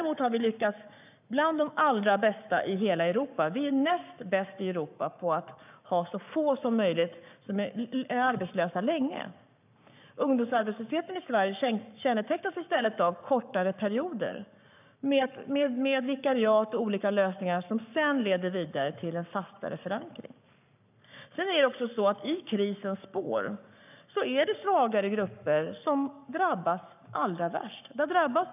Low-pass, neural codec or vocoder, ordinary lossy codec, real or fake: 3.6 kHz; codec, 44.1 kHz, 7.8 kbps, Pupu-Codec; none; fake